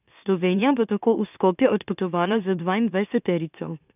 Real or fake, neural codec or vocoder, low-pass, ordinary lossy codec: fake; autoencoder, 44.1 kHz, a latent of 192 numbers a frame, MeloTTS; 3.6 kHz; none